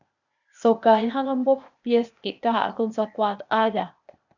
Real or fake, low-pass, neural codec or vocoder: fake; 7.2 kHz; codec, 16 kHz, 0.8 kbps, ZipCodec